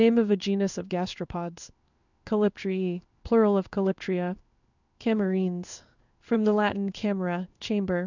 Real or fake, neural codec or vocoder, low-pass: fake; codec, 16 kHz in and 24 kHz out, 1 kbps, XY-Tokenizer; 7.2 kHz